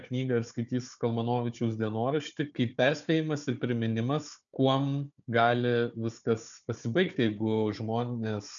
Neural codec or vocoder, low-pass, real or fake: codec, 16 kHz, 4 kbps, FunCodec, trained on Chinese and English, 50 frames a second; 7.2 kHz; fake